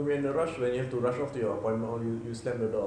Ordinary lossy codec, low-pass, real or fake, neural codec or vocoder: none; 9.9 kHz; real; none